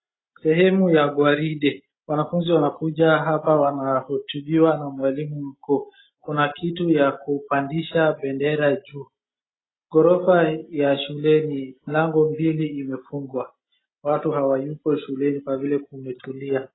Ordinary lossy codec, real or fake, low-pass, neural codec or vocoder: AAC, 16 kbps; real; 7.2 kHz; none